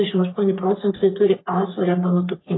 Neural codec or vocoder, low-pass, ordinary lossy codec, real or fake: codec, 44.1 kHz, 3.4 kbps, Pupu-Codec; 7.2 kHz; AAC, 16 kbps; fake